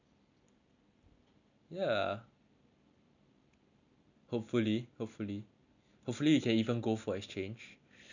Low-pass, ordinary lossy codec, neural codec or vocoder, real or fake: 7.2 kHz; none; none; real